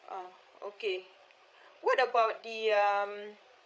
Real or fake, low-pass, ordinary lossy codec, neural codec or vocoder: fake; none; none; codec, 16 kHz, 8 kbps, FreqCodec, larger model